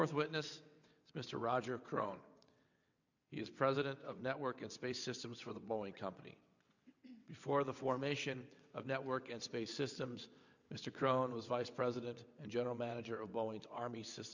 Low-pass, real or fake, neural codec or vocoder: 7.2 kHz; fake; vocoder, 22.05 kHz, 80 mel bands, WaveNeXt